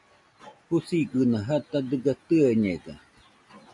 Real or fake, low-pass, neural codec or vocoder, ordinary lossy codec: real; 10.8 kHz; none; AAC, 64 kbps